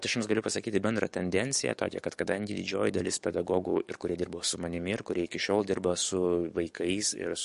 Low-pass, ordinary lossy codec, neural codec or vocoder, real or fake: 14.4 kHz; MP3, 48 kbps; codec, 44.1 kHz, 7.8 kbps, DAC; fake